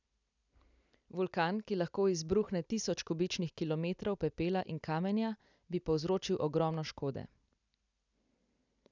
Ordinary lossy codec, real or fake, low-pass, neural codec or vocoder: none; real; 7.2 kHz; none